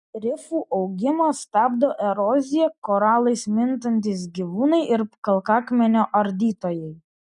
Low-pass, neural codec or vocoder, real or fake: 10.8 kHz; none; real